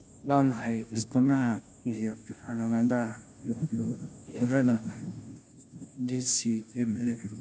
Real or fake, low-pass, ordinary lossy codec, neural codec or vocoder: fake; none; none; codec, 16 kHz, 0.5 kbps, FunCodec, trained on Chinese and English, 25 frames a second